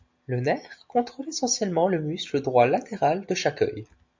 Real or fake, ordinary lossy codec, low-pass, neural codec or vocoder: real; MP3, 64 kbps; 7.2 kHz; none